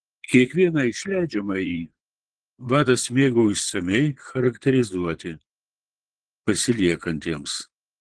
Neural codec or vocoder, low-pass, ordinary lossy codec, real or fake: vocoder, 22.05 kHz, 80 mel bands, Vocos; 9.9 kHz; Opus, 16 kbps; fake